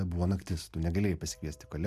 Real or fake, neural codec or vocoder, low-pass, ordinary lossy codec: real; none; 14.4 kHz; MP3, 96 kbps